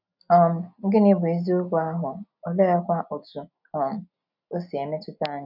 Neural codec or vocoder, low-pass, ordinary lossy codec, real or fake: none; 5.4 kHz; none; real